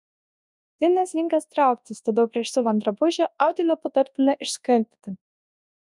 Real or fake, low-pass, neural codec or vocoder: fake; 10.8 kHz; codec, 24 kHz, 0.9 kbps, WavTokenizer, large speech release